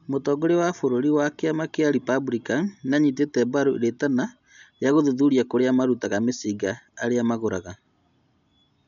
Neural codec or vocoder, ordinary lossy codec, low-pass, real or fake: none; none; 7.2 kHz; real